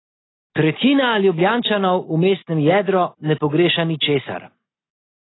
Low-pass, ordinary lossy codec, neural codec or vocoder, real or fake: 7.2 kHz; AAC, 16 kbps; none; real